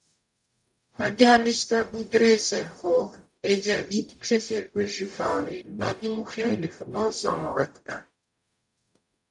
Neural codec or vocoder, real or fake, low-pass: codec, 44.1 kHz, 0.9 kbps, DAC; fake; 10.8 kHz